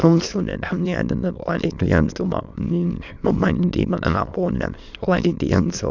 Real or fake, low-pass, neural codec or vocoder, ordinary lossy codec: fake; 7.2 kHz; autoencoder, 22.05 kHz, a latent of 192 numbers a frame, VITS, trained on many speakers; none